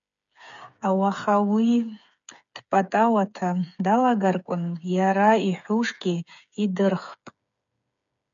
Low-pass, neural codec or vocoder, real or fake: 7.2 kHz; codec, 16 kHz, 8 kbps, FreqCodec, smaller model; fake